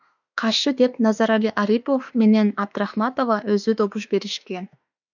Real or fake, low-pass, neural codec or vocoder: fake; 7.2 kHz; autoencoder, 48 kHz, 32 numbers a frame, DAC-VAE, trained on Japanese speech